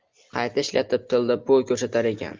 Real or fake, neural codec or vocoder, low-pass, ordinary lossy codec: real; none; 7.2 kHz; Opus, 24 kbps